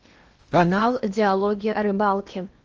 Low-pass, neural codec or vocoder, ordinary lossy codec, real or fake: 7.2 kHz; codec, 16 kHz in and 24 kHz out, 0.8 kbps, FocalCodec, streaming, 65536 codes; Opus, 24 kbps; fake